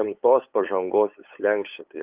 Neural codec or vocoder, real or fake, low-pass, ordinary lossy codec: codec, 16 kHz, 8 kbps, FunCodec, trained on LibriTTS, 25 frames a second; fake; 3.6 kHz; Opus, 32 kbps